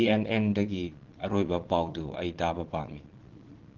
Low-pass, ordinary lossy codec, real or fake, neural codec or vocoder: 7.2 kHz; Opus, 16 kbps; fake; vocoder, 22.05 kHz, 80 mel bands, Vocos